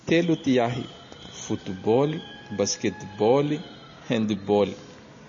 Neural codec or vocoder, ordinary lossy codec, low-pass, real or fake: none; MP3, 32 kbps; 7.2 kHz; real